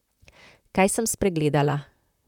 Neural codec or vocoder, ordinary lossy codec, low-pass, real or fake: vocoder, 44.1 kHz, 128 mel bands, Pupu-Vocoder; none; 19.8 kHz; fake